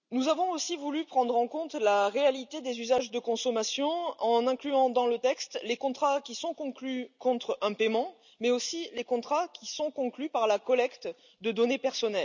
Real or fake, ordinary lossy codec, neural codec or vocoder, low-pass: real; none; none; 7.2 kHz